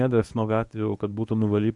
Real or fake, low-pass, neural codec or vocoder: fake; 10.8 kHz; codec, 24 kHz, 0.9 kbps, WavTokenizer, medium speech release version 1